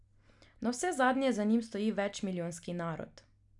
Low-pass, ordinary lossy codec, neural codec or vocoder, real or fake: 10.8 kHz; none; none; real